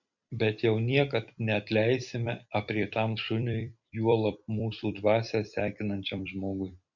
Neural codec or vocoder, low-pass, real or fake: vocoder, 44.1 kHz, 128 mel bands every 256 samples, BigVGAN v2; 7.2 kHz; fake